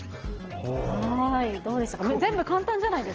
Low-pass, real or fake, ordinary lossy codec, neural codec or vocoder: 7.2 kHz; real; Opus, 16 kbps; none